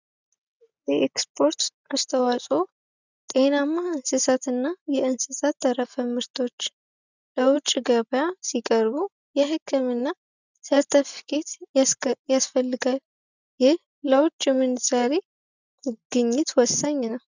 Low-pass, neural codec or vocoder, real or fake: 7.2 kHz; none; real